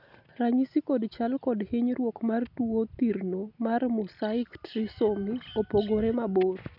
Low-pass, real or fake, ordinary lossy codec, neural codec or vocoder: 5.4 kHz; real; none; none